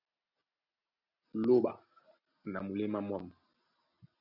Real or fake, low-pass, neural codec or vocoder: real; 5.4 kHz; none